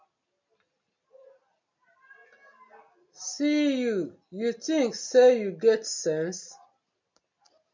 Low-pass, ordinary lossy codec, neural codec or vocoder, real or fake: 7.2 kHz; MP3, 64 kbps; none; real